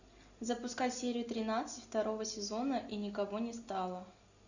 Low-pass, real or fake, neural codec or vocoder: 7.2 kHz; real; none